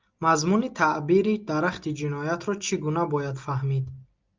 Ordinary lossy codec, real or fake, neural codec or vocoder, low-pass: Opus, 24 kbps; real; none; 7.2 kHz